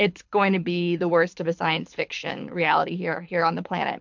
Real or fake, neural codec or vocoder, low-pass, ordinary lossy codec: fake; codec, 24 kHz, 6 kbps, HILCodec; 7.2 kHz; MP3, 64 kbps